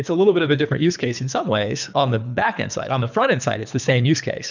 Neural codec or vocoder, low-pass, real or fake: codec, 24 kHz, 3 kbps, HILCodec; 7.2 kHz; fake